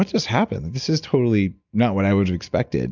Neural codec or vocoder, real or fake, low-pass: none; real; 7.2 kHz